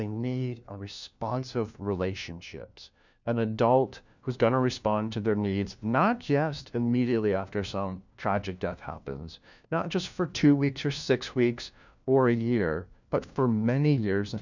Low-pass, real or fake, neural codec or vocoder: 7.2 kHz; fake; codec, 16 kHz, 1 kbps, FunCodec, trained on LibriTTS, 50 frames a second